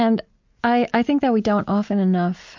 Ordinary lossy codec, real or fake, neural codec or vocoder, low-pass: MP3, 48 kbps; real; none; 7.2 kHz